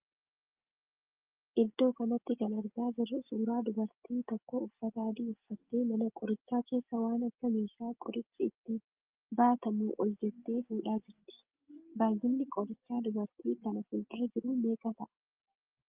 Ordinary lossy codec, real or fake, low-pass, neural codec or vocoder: Opus, 32 kbps; real; 3.6 kHz; none